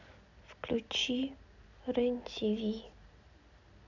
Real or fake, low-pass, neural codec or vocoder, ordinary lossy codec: real; 7.2 kHz; none; none